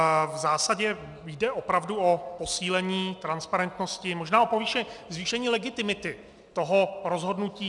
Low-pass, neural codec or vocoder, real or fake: 10.8 kHz; none; real